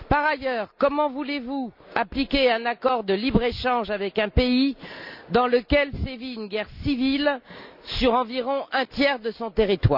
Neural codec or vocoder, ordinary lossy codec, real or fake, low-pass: none; none; real; 5.4 kHz